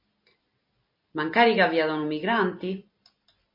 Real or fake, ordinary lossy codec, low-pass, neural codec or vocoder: real; MP3, 32 kbps; 5.4 kHz; none